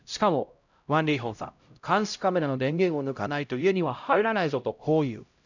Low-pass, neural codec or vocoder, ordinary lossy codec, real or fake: 7.2 kHz; codec, 16 kHz, 0.5 kbps, X-Codec, HuBERT features, trained on LibriSpeech; none; fake